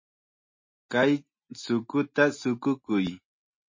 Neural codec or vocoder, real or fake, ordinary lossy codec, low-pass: none; real; MP3, 32 kbps; 7.2 kHz